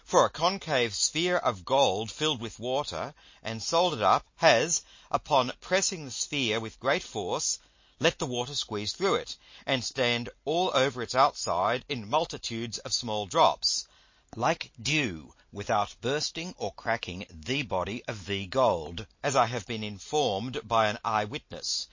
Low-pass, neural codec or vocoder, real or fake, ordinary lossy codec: 7.2 kHz; none; real; MP3, 32 kbps